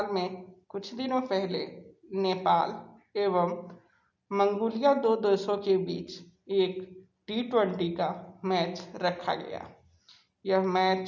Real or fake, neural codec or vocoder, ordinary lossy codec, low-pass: real; none; none; 7.2 kHz